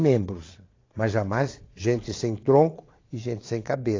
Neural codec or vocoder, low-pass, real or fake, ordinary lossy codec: vocoder, 22.05 kHz, 80 mel bands, Vocos; 7.2 kHz; fake; AAC, 32 kbps